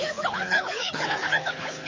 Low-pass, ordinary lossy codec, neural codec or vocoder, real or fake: 7.2 kHz; MP3, 32 kbps; codec, 24 kHz, 6 kbps, HILCodec; fake